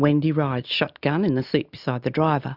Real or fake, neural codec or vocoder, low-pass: real; none; 5.4 kHz